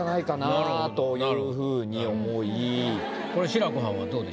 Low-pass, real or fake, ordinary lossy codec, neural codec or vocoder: none; real; none; none